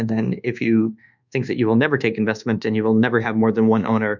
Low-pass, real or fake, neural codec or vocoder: 7.2 kHz; fake; codec, 24 kHz, 1.2 kbps, DualCodec